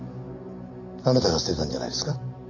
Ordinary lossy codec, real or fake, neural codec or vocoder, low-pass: none; real; none; 7.2 kHz